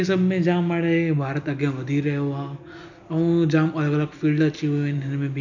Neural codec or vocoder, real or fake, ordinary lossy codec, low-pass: none; real; none; 7.2 kHz